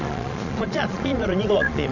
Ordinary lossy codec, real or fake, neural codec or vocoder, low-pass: none; fake; vocoder, 22.05 kHz, 80 mel bands, Vocos; 7.2 kHz